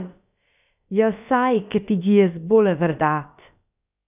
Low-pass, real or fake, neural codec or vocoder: 3.6 kHz; fake; codec, 16 kHz, about 1 kbps, DyCAST, with the encoder's durations